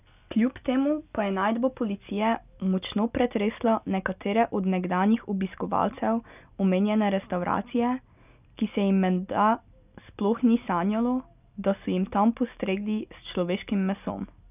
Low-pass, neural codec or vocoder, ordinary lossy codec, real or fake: 3.6 kHz; none; none; real